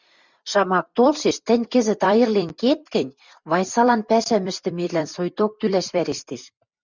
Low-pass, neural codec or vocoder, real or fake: 7.2 kHz; vocoder, 44.1 kHz, 128 mel bands every 256 samples, BigVGAN v2; fake